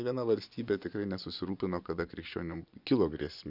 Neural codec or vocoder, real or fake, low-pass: vocoder, 24 kHz, 100 mel bands, Vocos; fake; 5.4 kHz